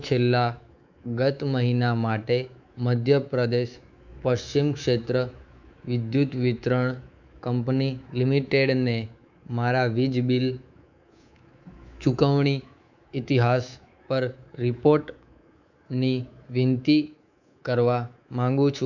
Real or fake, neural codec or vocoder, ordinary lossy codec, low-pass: fake; codec, 24 kHz, 3.1 kbps, DualCodec; none; 7.2 kHz